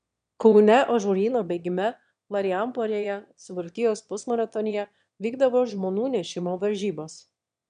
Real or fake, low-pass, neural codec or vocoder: fake; 9.9 kHz; autoencoder, 22.05 kHz, a latent of 192 numbers a frame, VITS, trained on one speaker